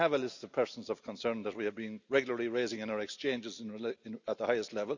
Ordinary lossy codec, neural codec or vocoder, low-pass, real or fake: none; none; 7.2 kHz; real